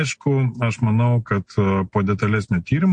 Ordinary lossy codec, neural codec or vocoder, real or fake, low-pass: MP3, 48 kbps; none; real; 9.9 kHz